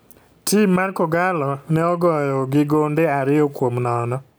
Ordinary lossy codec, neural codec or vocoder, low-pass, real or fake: none; none; none; real